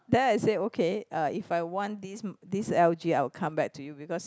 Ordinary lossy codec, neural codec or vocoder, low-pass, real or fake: none; none; none; real